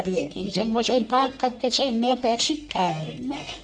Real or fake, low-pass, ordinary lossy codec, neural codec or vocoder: fake; 9.9 kHz; none; codec, 44.1 kHz, 1.7 kbps, Pupu-Codec